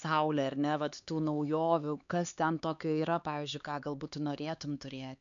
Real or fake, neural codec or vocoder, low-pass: fake; codec, 16 kHz, 4 kbps, X-Codec, HuBERT features, trained on LibriSpeech; 7.2 kHz